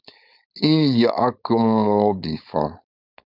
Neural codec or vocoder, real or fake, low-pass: codec, 16 kHz, 4.8 kbps, FACodec; fake; 5.4 kHz